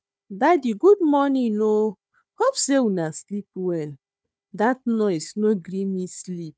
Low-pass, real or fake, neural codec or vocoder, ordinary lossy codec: none; fake; codec, 16 kHz, 4 kbps, FunCodec, trained on Chinese and English, 50 frames a second; none